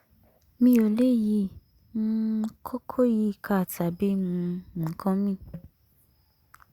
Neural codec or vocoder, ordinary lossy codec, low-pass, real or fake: none; Opus, 64 kbps; 19.8 kHz; real